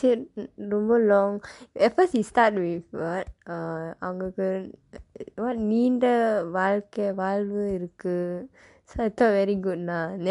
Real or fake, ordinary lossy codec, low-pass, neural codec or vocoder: real; MP3, 64 kbps; 10.8 kHz; none